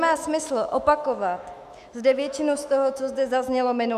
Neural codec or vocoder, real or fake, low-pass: autoencoder, 48 kHz, 128 numbers a frame, DAC-VAE, trained on Japanese speech; fake; 14.4 kHz